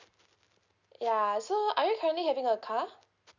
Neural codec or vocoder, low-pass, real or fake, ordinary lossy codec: none; 7.2 kHz; real; none